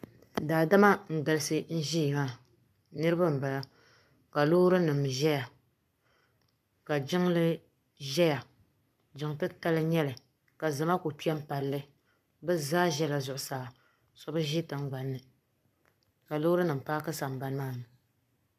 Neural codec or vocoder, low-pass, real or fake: codec, 44.1 kHz, 7.8 kbps, Pupu-Codec; 14.4 kHz; fake